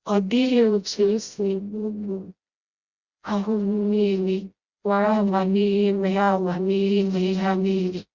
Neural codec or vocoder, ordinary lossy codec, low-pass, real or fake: codec, 16 kHz, 0.5 kbps, FreqCodec, smaller model; Opus, 64 kbps; 7.2 kHz; fake